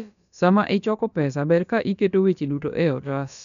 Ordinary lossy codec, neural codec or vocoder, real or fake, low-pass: none; codec, 16 kHz, about 1 kbps, DyCAST, with the encoder's durations; fake; 7.2 kHz